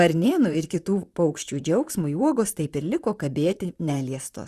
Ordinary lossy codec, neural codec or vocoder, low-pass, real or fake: Opus, 64 kbps; vocoder, 44.1 kHz, 128 mel bands, Pupu-Vocoder; 14.4 kHz; fake